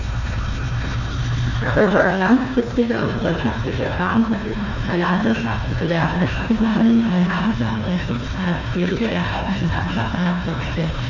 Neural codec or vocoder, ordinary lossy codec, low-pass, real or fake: codec, 16 kHz, 1 kbps, FunCodec, trained on Chinese and English, 50 frames a second; none; 7.2 kHz; fake